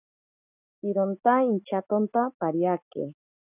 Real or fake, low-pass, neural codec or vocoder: real; 3.6 kHz; none